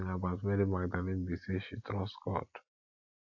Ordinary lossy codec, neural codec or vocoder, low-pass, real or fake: AAC, 48 kbps; none; 7.2 kHz; real